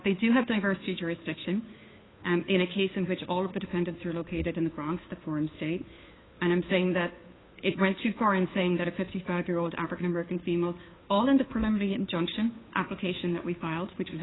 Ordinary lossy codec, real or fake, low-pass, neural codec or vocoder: AAC, 16 kbps; fake; 7.2 kHz; codec, 16 kHz, 2 kbps, FunCodec, trained on Chinese and English, 25 frames a second